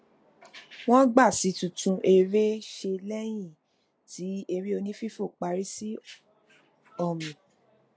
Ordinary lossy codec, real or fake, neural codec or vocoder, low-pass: none; real; none; none